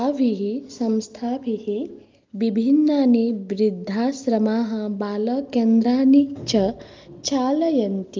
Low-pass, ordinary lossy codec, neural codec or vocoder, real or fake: 7.2 kHz; Opus, 32 kbps; none; real